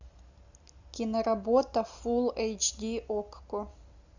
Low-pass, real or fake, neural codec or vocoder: 7.2 kHz; real; none